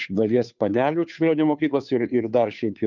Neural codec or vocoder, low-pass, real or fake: codec, 16 kHz, 2 kbps, FunCodec, trained on Chinese and English, 25 frames a second; 7.2 kHz; fake